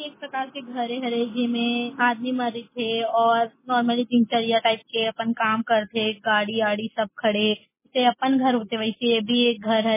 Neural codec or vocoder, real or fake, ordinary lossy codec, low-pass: none; real; MP3, 16 kbps; 3.6 kHz